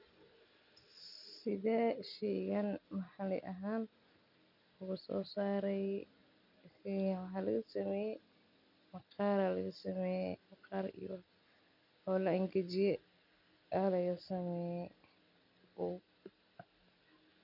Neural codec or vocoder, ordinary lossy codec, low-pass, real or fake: none; none; 5.4 kHz; real